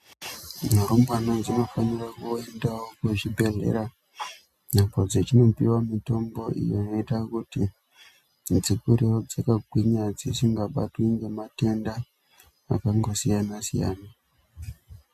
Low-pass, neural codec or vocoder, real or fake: 14.4 kHz; none; real